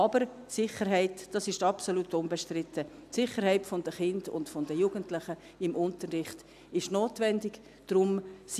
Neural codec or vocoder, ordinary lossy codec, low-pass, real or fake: none; none; 14.4 kHz; real